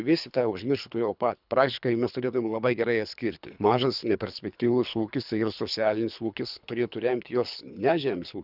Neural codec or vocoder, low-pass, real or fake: codec, 24 kHz, 3 kbps, HILCodec; 5.4 kHz; fake